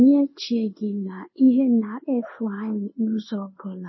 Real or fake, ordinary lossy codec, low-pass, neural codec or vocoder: fake; MP3, 24 kbps; 7.2 kHz; codec, 16 kHz in and 24 kHz out, 1 kbps, XY-Tokenizer